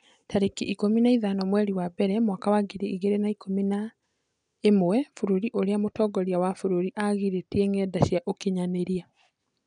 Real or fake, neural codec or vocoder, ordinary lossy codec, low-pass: real; none; none; 9.9 kHz